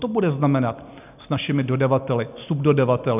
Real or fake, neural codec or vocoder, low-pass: real; none; 3.6 kHz